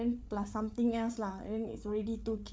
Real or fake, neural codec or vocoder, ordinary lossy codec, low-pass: fake; codec, 16 kHz, 8 kbps, FreqCodec, larger model; none; none